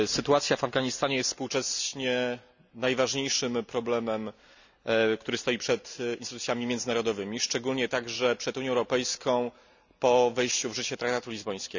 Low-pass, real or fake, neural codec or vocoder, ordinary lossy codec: 7.2 kHz; real; none; none